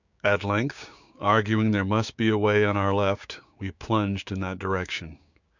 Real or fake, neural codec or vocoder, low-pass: fake; codec, 16 kHz, 6 kbps, DAC; 7.2 kHz